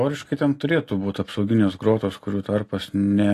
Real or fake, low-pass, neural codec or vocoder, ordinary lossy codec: real; 14.4 kHz; none; AAC, 48 kbps